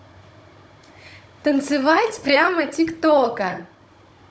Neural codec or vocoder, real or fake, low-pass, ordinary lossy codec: codec, 16 kHz, 16 kbps, FunCodec, trained on Chinese and English, 50 frames a second; fake; none; none